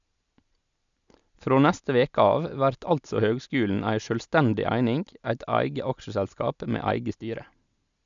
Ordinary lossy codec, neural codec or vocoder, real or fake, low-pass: none; none; real; 7.2 kHz